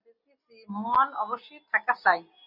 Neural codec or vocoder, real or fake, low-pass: none; real; 5.4 kHz